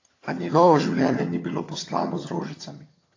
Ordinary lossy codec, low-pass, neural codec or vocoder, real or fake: AAC, 32 kbps; 7.2 kHz; vocoder, 22.05 kHz, 80 mel bands, HiFi-GAN; fake